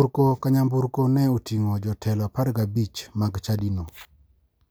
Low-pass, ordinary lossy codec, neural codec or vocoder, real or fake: none; none; none; real